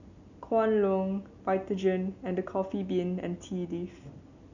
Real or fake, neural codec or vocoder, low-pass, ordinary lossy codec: real; none; 7.2 kHz; none